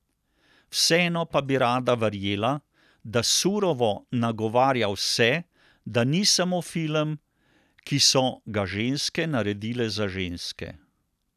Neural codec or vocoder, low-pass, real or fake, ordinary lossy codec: none; 14.4 kHz; real; none